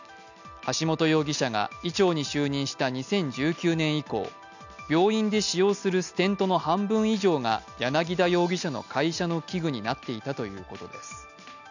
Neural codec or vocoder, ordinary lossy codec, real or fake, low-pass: none; none; real; 7.2 kHz